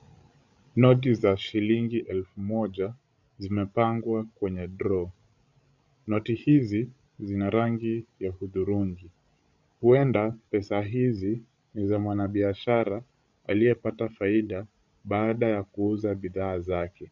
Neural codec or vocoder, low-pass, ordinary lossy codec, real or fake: codec, 16 kHz, 16 kbps, FreqCodec, larger model; 7.2 kHz; Opus, 64 kbps; fake